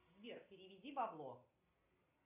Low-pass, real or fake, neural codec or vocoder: 3.6 kHz; real; none